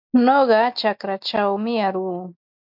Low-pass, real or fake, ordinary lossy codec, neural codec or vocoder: 5.4 kHz; real; MP3, 48 kbps; none